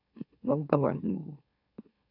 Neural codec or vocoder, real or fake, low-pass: autoencoder, 44.1 kHz, a latent of 192 numbers a frame, MeloTTS; fake; 5.4 kHz